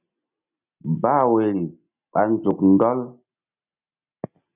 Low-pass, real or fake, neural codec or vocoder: 3.6 kHz; real; none